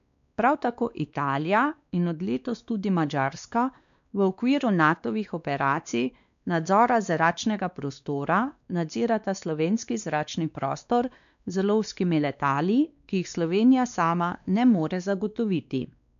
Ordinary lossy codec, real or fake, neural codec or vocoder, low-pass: none; fake; codec, 16 kHz, 2 kbps, X-Codec, WavLM features, trained on Multilingual LibriSpeech; 7.2 kHz